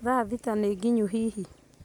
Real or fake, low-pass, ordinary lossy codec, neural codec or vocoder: real; 19.8 kHz; none; none